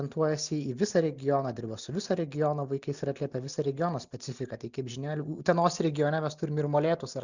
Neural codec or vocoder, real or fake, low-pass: none; real; 7.2 kHz